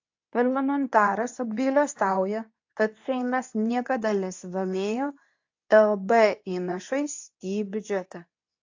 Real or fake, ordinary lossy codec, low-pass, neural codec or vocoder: fake; AAC, 48 kbps; 7.2 kHz; codec, 24 kHz, 0.9 kbps, WavTokenizer, medium speech release version 2